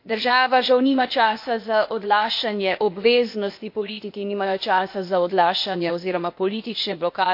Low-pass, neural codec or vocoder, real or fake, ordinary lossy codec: 5.4 kHz; codec, 16 kHz, 0.8 kbps, ZipCodec; fake; MP3, 32 kbps